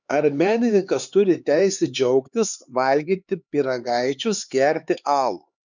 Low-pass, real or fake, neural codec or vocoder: 7.2 kHz; fake; codec, 16 kHz, 4 kbps, X-Codec, HuBERT features, trained on LibriSpeech